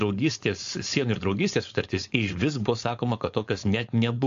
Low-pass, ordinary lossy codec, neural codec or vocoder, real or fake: 7.2 kHz; AAC, 64 kbps; codec, 16 kHz, 4.8 kbps, FACodec; fake